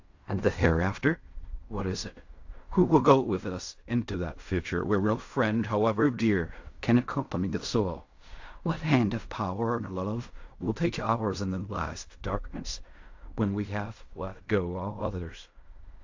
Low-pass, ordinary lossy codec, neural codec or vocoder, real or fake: 7.2 kHz; AAC, 48 kbps; codec, 16 kHz in and 24 kHz out, 0.4 kbps, LongCat-Audio-Codec, fine tuned four codebook decoder; fake